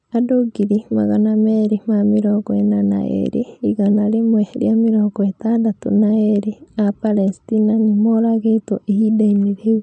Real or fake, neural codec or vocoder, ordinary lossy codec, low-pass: real; none; none; 9.9 kHz